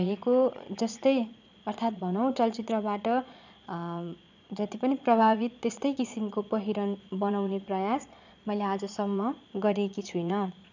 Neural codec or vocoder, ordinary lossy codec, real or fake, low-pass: vocoder, 22.05 kHz, 80 mel bands, Vocos; none; fake; 7.2 kHz